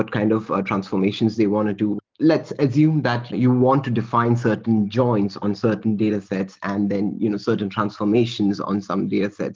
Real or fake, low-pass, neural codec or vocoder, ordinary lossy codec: real; 7.2 kHz; none; Opus, 32 kbps